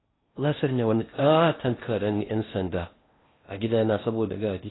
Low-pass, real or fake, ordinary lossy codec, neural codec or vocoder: 7.2 kHz; fake; AAC, 16 kbps; codec, 16 kHz in and 24 kHz out, 0.6 kbps, FocalCodec, streaming, 4096 codes